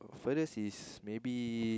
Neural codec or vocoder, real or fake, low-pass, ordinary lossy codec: none; real; none; none